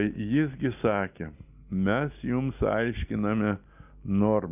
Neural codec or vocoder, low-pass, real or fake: none; 3.6 kHz; real